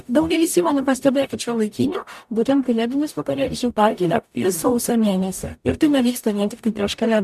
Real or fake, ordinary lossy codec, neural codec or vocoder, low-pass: fake; MP3, 96 kbps; codec, 44.1 kHz, 0.9 kbps, DAC; 14.4 kHz